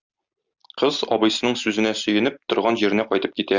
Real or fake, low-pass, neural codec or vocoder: real; 7.2 kHz; none